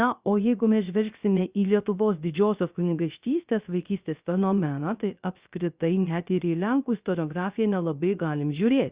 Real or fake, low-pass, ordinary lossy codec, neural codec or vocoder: fake; 3.6 kHz; Opus, 64 kbps; codec, 16 kHz, 0.3 kbps, FocalCodec